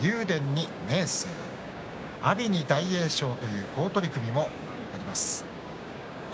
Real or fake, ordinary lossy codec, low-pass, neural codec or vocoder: fake; none; none; codec, 16 kHz, 6 kbps, DAC